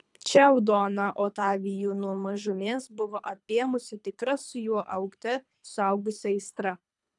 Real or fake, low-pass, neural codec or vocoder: fake; 10.8 kHz; codec, 24 kHz, 3 kbps, HILCodec